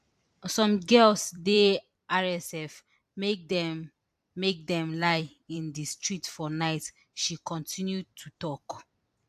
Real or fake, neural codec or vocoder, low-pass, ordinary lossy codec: fake; vocoder, 44.1 kHz, 128 mel bands every 256 samples, BigVGAN v2; 14.4 kHz; AAC, 96 kbps